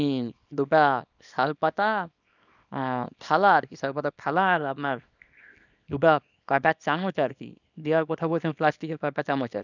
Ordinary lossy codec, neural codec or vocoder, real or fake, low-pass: none; codec, 24 kHz, 0.9 kbps, WavTokenizer, small release; fake; 7.2 kHz